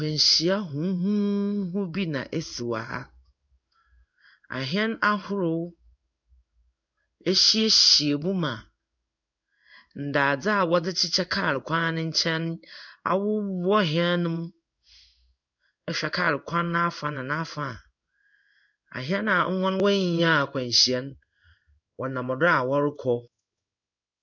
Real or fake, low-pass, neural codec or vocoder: fake; 7.2 kHz; codec, 16 kHz in and 24 kHz out, 1 kbps, XY-Tokenizer